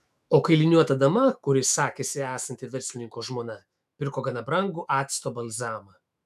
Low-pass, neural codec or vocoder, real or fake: 14.4 kHz; autoencoder, 48 kHz, 128 numbers a frame, DAC-VAE, trained on Japanese speech; fake